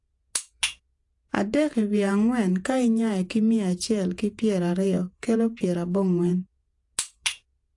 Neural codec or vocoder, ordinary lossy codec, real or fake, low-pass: vocoder, 48 kHz, 128 mel bands, Vocos; AAC, 64 kbps; fake; 10.8 kHz